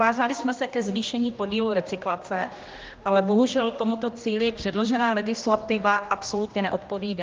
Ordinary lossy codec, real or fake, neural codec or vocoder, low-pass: Opus, 32 kbps; fake; codec, 16 kHz, 1 kbps, X-Codec, HuBERT features, trained on general audio; 7.2 kHz